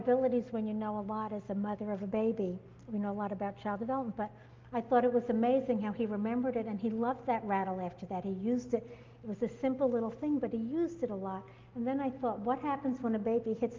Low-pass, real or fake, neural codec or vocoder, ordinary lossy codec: 7.2 kHz; real; none; Opus, 32 kbps